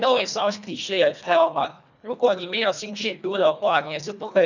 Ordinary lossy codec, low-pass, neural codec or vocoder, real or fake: none; 7.2 kHz; codec, 24 kHz, 1.5 kbps, HILCodec; fake